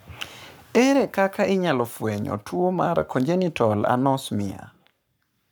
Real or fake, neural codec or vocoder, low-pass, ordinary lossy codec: fake; codec, 44.1 kHz, 7.8 kbps, Pupu-Codec; none; none